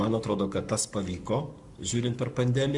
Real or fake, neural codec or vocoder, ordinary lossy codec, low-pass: fake; codec, 44.1 kHz, 7.8 kbps, Pupu-Codec; Opus, 64 kbps; 10.8 kHz